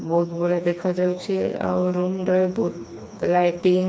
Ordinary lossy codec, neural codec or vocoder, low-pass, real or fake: none; codec, 16 kHz, 2 kbps, FreqCodec, smaller model; none; fake